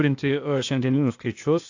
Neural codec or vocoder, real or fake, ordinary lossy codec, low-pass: codec, 16 kHz, 0.8 kbps, ZipCodec; fake; AAC, 48 kbps; 7.2 kHz